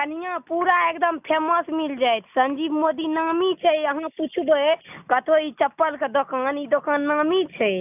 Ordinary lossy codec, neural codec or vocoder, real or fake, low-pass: none; none; real; 3.6 kHz